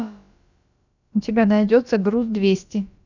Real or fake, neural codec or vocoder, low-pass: fake; codec, 16 kHz, about 1 kbps, DyCAST, with the encoder's durations; 7.2 kHz